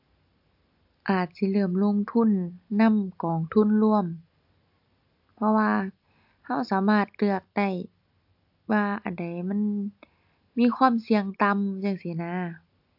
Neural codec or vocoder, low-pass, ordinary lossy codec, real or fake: none; 5.4 kHz; AAC, 48 kbps; real